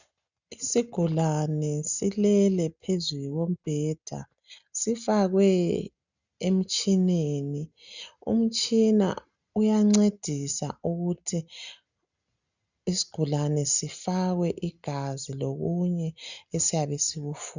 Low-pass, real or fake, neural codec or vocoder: 7.2 kHz; real; none